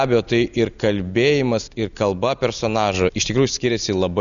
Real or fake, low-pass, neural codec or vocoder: real; 7.2 kHz; none